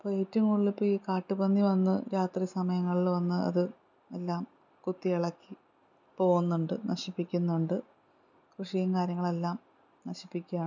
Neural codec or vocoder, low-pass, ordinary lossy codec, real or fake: none; 7.2 kHz; none; real